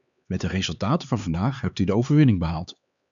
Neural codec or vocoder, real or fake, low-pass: codec, 16 kHz, 4 kbps, X-Codec, HuBERT features, trained on LibriSpeech; fake; 7.2 kHz